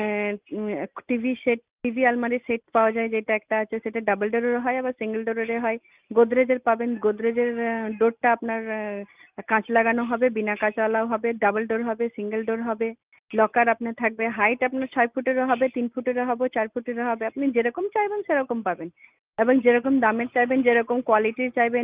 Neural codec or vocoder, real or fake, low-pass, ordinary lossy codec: none; real; 3.6 kHz; Opus, 32 kbps